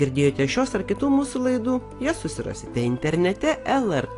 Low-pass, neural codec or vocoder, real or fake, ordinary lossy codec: 10.8 kHz; none; real; AAC, 48 kbps